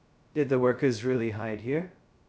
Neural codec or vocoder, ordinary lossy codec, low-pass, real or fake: codec, 16 kHz, 0.2 kbps, FocalCodec; none; none; fake